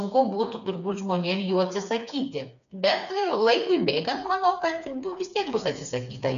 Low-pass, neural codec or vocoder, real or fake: 7.2 kHz; codec, 16 kHz, 4 kbps, FreqCodec, smaller model; fake